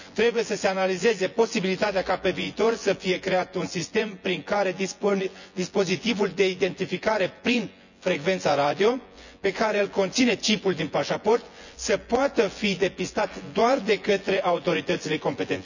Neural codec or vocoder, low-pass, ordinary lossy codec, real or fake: vocoder, 24 kHz, 100 mel bands, Vocos; 7.2 kHz; none; fake